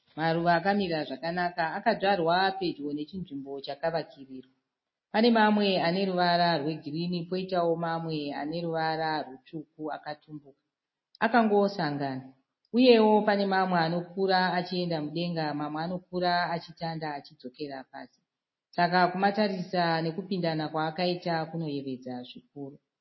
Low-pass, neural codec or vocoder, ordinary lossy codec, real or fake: 7.2 kHz; none; MP3, 24 kbps; real